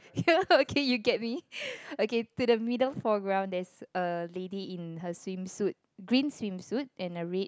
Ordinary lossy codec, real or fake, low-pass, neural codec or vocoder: none; real; none; none